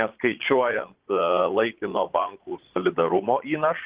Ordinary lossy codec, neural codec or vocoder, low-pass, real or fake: Opus, 16 kbps; vocoder, 22.05 kHz, 80 mel bands, Vocos; 3.6 kHz; fake